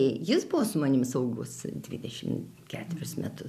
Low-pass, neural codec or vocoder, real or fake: 14.4 kHz; none; real